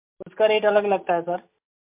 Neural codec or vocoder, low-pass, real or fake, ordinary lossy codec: none; 3.6 kHz; real; MP3, 32 kbps